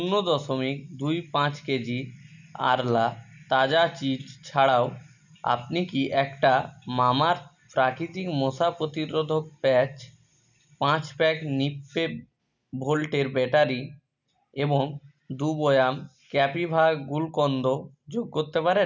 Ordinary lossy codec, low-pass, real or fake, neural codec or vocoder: none; 7.2 kHz; real; none